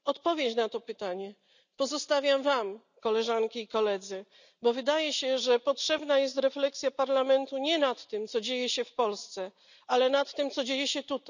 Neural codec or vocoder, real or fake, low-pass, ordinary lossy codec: none; real; 7.2 kHz; none